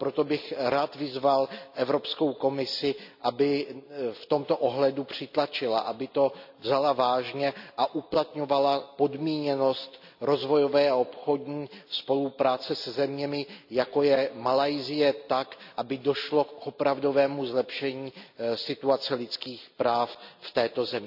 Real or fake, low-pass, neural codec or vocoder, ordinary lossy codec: real; 5.4 kHz; none; none